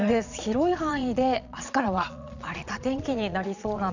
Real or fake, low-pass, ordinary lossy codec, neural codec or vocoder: fake; 7.2 kHz; none; vocoder, 22.05 kHz, 80 mel bands, Vocos